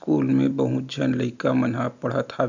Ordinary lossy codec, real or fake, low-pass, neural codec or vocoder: none; real; 7.2 kHz; none